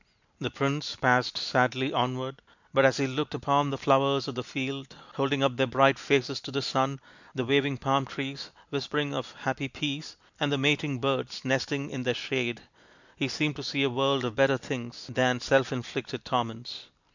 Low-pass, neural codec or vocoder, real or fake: 7.2 kHz; none; real